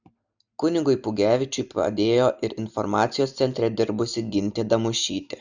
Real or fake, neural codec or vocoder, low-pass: real; none; 7.2 kHz